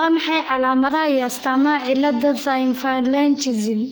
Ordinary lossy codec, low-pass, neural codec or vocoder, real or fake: none; none; codec, 44.1 kHz, 2.6 kbps, SNAC; fake